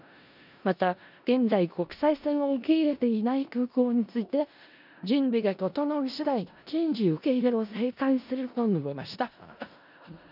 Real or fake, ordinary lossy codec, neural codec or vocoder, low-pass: fake; none; codec, 16 kHz in and 24 kHz out, 0.4 kbps, LongCat-Audio-Codec, four codebook decoder; 5.4 kHz